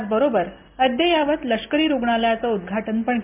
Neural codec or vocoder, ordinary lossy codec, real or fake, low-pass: none; MP3, 32 kbps; real; 3.6 kHz